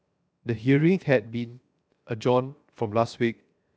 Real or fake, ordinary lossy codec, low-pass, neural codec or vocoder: fake; none; none; codec, 16 kHz, 0.7 kbps, FocalCodec